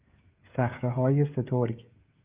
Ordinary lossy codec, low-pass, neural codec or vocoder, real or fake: Opus, 24 kbps; 3.6 kHz; codec, 16 kHz, 16 kbps, FreqCodec, smaller model; fake